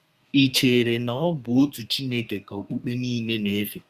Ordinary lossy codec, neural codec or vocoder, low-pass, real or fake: Opus, 64 kbps; codec, 32 kHz, 1.9 kbps, SNAC; 14.4 kHz; fake